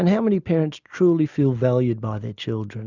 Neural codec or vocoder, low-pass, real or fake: none; 7.2 kHz; real